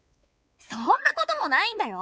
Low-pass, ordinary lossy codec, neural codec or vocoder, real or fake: none; none; codec, 16 kHz, 4 kbps, X-Codec, WavLM features, trained on Multilingual LibriSpeech; fake